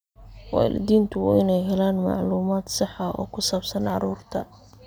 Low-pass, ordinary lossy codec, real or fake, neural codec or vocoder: none; none; real; none